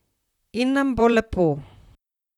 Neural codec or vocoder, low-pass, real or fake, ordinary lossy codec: vocoder, 44.1 kHz, 128 mel bands, Pupu-Vocoder; 19.8 kHz; fake; none